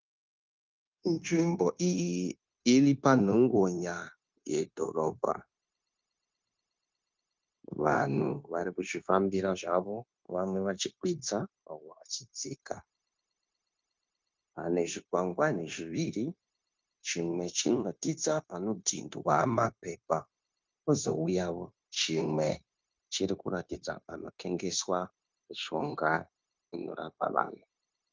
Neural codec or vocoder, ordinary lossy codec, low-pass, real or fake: codec, 16 kHz, 0.9 kbps, LongCat-Audio-Codec; Opus, 24 kbps; 7.2 kHz; fake